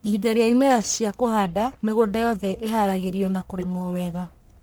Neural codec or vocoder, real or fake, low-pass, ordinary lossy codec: codec, 44.1 kHz, 1.7 kbps, Pupu-Codec; fake; none; none